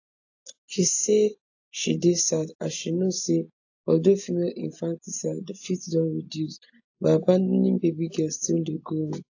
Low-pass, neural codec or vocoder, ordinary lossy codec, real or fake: 7.2 kHz; none; AAC, 48 kbps; real